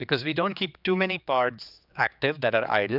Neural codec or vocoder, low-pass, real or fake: codec, 16 kHz, 4 kbps, X-Codec, HuBERT features, trained on general audio; 5.4 kHz; fake